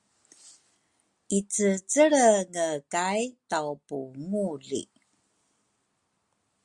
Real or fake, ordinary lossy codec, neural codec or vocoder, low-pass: real; Opus, 64 kbps; none; 10.8 kHz